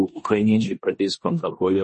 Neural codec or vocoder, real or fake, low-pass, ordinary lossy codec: codec, 16 kHz in and 24 kHz out, 0.9 kbps, LongCat-Audio-Codec, four codebook decoder; fake; 10.8 kHz; MP3, 32 kbps